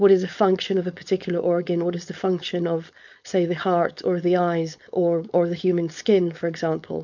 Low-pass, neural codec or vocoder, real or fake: 7.2 kHz; codec, 16 kHz, 4.8 kbps, FACodec; fake